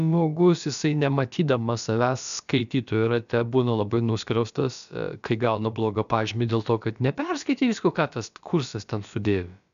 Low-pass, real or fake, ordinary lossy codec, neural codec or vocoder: 7.2 kHz; fake; MP3, 96 kbps; codec, 16 kHz, about 1 kbps, DyCAST, with the encoder's durations